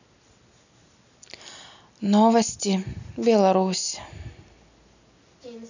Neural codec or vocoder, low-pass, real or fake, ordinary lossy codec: none; 7.2 kHz; real; none